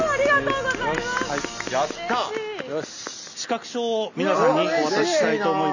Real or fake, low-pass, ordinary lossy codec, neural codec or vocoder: real; 7.2 kHz; none; none